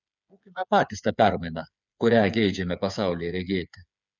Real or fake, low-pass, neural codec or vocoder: fake; 7.2 kHz; codec, 16 kHz, 8 kbps, FreqCodec, smaller model